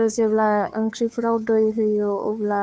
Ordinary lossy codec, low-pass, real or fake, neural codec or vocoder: none; none; fake; codec, 16 kHz, 2 kbps, FunCodec, trained on Chinese and English, 25 frames a second